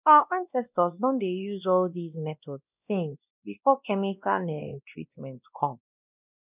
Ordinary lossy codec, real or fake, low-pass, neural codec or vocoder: none; fake; 3.6 kHz; codec, 16 kHz, 1 kbps, X-Codec, WavLM features, trained on Multilingual LibriSpeech